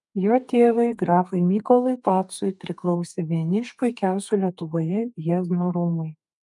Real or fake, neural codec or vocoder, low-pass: fake; codec, 32 kHz, 1.9 kbps, SNAC; 10.8 kHz